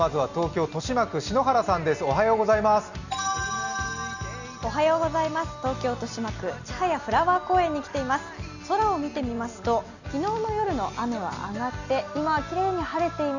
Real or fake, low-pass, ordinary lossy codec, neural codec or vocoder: real; 7.2 kHz; none; none